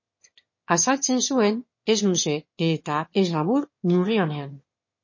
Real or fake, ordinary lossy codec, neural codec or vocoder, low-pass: fake; MP3, 32 kbps; autoencoder, 22.05 kHz, a latent of 192 numbers a frame, VITS, trained on one speaker; 7.2 kHz